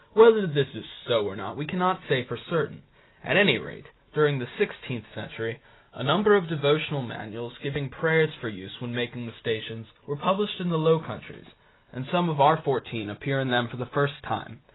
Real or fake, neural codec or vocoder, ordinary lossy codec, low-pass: real; none; AAC, 16 kbps; 7.2 kHz